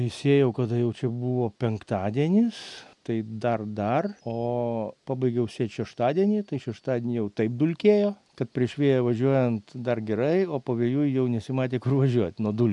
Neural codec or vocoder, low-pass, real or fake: none; 10.8 kHz; real